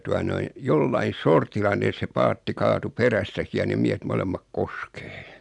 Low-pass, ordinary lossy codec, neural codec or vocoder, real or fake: 10.8 kHz; MP3, 96 kbps; none; real